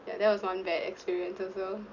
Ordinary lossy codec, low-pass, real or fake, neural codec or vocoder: none; 7.2 kHz; real; none